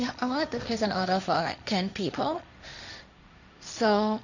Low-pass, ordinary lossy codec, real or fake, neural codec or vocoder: 7.2 kHz; none; fake; codec, 16 kHz, 1.1 kbps, Voila-Tokenizer